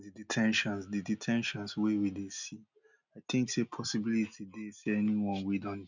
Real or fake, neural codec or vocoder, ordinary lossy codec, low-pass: real; none; none; 7.2 kHz